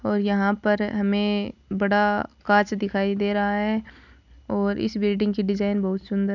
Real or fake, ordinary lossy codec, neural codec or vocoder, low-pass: real; none; none; 7.2 kHz